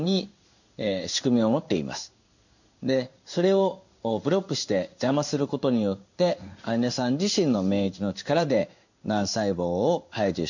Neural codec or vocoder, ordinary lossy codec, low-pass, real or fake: codec, 16 kHz in and 24 kHz out, 1 kbps, XY-Tokenizer; none; 7.2 kHz; fake